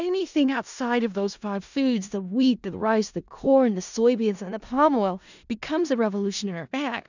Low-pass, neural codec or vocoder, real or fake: 7.2 kHz; codec, 16 kHz in and 24 kHz out, 0.4 kbps, LongCat-Audio-Codec, four codebook decoder; fake